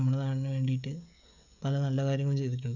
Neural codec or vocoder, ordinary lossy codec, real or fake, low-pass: codec, 16 kHz, 16 kbps, FreqCodec, smaller model; none; fake; 7.2 kHz